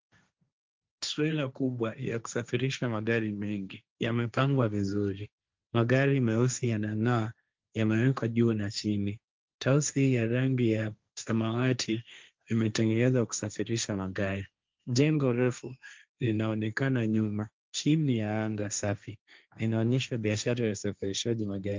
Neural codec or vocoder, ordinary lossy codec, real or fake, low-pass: codec, 16 kHz, 1.1 kbps, Voila-Tokenizer; Opus, 24 kbps; fake; 7.2 kHz